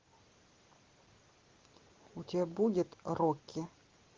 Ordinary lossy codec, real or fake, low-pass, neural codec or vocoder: Opus, 16 kbps; real; 7.2 kHz; none